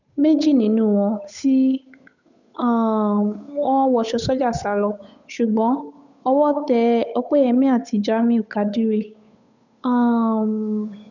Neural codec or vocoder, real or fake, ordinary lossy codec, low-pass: codec, 16 kHz, 8 kbps, FunCodec, trained on Chinese and English, 25 frames a second; fake; none; 7.2 kHz